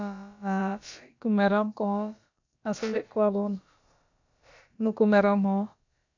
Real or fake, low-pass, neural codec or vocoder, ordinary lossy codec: fake; 7.2 kHz; codec, 16 kHz, about 1 kbps, DyCAST, with the encoder's durations; MP3, 64 kbps